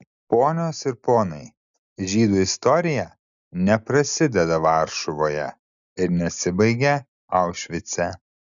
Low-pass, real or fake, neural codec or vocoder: 7.2 kHz; real; none